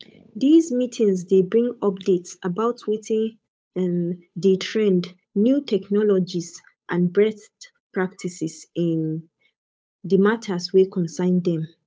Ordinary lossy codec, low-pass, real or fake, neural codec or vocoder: none; none; fake; codec, 16 kHz, 8 kbps, FunCodec, trained on Chinese and English, 25 frames a second